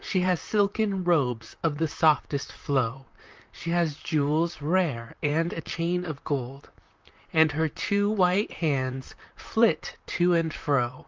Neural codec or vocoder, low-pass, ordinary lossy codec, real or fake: vocoder, 44.1 kHz, 128 mel bands, Pupu-Vocoder; 7.2 kHz; Opus, 24 kbps; fake